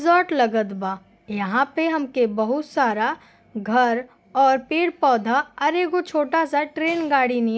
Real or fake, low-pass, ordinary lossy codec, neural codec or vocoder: real; none; none; none